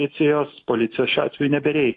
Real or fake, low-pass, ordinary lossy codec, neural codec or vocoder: fake; 10.8 kHz; AAC, 48 kbps; autoencoder, 48 kHz, 128 numbers a frame, DAC-VAE, trained on Japanese speech